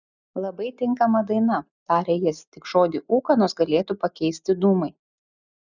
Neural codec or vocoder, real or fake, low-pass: none; real; 7.2 kHz